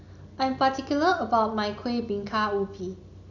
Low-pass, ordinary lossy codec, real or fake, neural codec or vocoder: 7.2 kHz; none; real; none